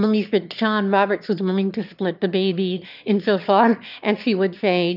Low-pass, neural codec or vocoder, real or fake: 5.4 kHz; autoencoder, 22.05 kHz, a latent of 192 numbers a frame, VITS, trained on one speaker; fake